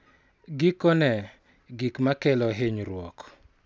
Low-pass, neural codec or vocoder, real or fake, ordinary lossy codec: none; none; real; none